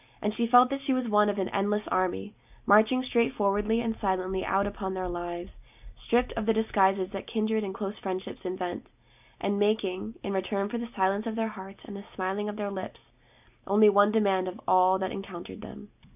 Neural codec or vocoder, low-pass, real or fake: none; 3.6 kHz; real